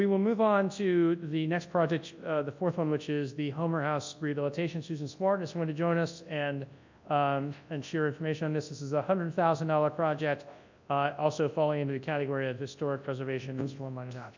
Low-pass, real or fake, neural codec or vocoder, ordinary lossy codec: 7.2 kHz; fake; codec, 24 kHz, 0.9 kbps, WavTokenizer, large speech release; MP3, 64 kbps